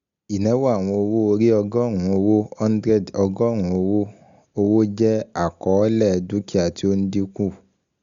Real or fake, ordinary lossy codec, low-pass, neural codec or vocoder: real; Opus, 64 kbps; 7.2 kHz; none